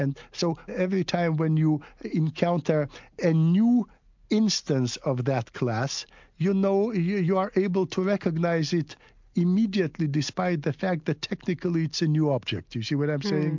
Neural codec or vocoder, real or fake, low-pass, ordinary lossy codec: none; real; 7.2 kHz; MP3, 64 kbps